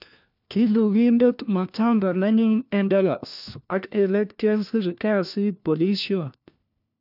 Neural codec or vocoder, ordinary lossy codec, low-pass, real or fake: codec, 16 kHz, 1 kbps, FunCodec, trained on LibriTTS, 50 frames a second; none; 5.4 kHz; fake